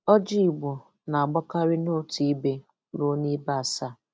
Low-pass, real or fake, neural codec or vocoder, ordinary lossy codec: none; real; none; none